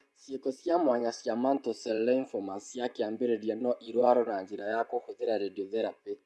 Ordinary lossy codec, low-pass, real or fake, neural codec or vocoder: none; none; fake; vocoder, 24 kHz, 100 mel bands, Vocos